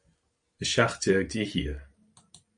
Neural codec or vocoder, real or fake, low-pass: none; real; 9.9 kHz